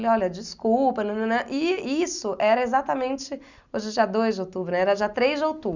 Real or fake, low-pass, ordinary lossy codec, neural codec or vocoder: real; 7.2 kHz; none; none